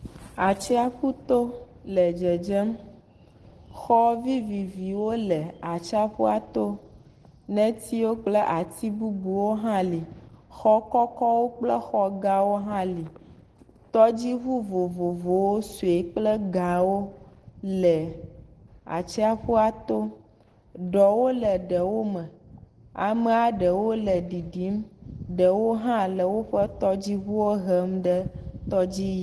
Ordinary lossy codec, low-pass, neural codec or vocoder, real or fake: Opus, 16 kbps; 10.8 kHz; none; real